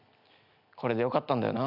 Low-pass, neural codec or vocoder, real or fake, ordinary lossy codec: 5.4 kHz; none; real; none